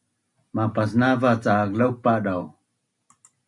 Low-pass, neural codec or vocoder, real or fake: 10.8 kHz; none; real